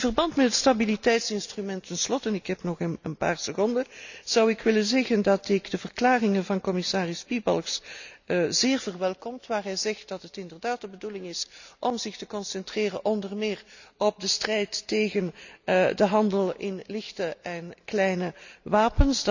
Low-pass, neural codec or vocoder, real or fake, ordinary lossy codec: 7.2 kHz; none; real; none